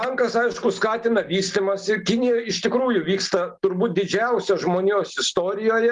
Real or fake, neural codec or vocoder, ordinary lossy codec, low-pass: real; none; Opus, 24 kbps; 10.8 kHz